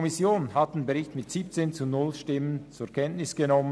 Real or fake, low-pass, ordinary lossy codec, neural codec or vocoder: real; none; none; none